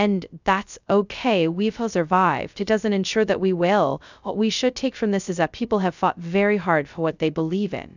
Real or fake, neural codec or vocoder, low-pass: fake; codec, 16 kHz, 0.2 kbps, FocalCodec; 7.2 kHz